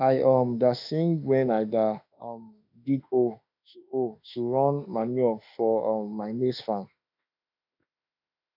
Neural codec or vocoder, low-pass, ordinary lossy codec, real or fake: autoencoder, 48 kHz, 32 numbers a frame, DAC-VAE, trained on Japanese speech; 5.4 kHz; none; fake